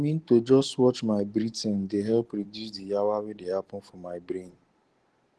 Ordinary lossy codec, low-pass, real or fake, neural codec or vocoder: Opus, 16 kbps; 10.8 kHz; fake; vocoder, 44.1 kHz, 128 mel bands every 512 samples, BigVGAN v2